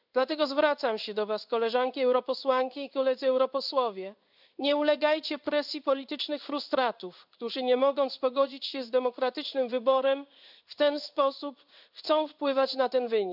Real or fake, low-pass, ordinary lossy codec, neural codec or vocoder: fake; 5.4 kHz; none; codec, 16 kHz in and 24 kHz out, 1 kbps, XY-Tokenizer